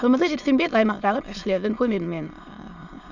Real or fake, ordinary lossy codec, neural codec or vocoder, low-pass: fake; none; autoencoder, 22.05 kHz, a latent of 192 numbers a frame, VITS, trained on many speakers; 7.2 kHz